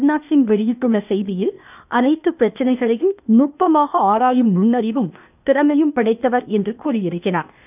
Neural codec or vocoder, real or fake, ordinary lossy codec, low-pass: codec, 16 kHz, 0.8 kbps, ZipCodec; fake; none; 3.6 kHz